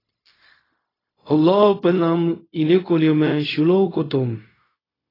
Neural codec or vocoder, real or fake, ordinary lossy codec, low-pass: codec, 16 kHz, 0.4 kbps, LongCat-Audio-Codec; fake; AAC, 24 kbps; 5.4 kHz